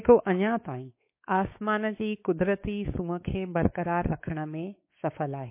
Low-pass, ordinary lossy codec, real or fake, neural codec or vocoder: 3.6 kHz; MP3, 24 kbps; fake; codec, 16 kHz, 4 kbps, X-Codec, WavLM features, trained on Multilingual LibriSpeech